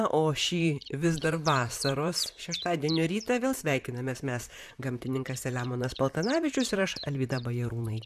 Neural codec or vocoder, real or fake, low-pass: vocoder, 44.1 kHz, 128 mel bands, Pupu-Vocoder; fake; 14.4 kHz